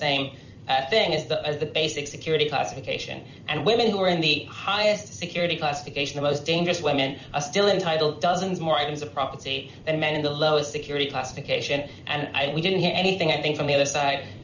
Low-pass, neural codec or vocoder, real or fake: 7.2 kHz; vocoder, 44.1 kHz, 128 mel bands every 256 samples, BigVGAN v2; fake